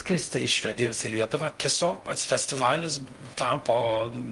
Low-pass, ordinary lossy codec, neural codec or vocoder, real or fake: 10.8 kHz; Opus, 32 kbps; codec, 16 kHz in and 24 kHz out, 0.6 kbps, FocalCodec, streaming, 2048 codes; fake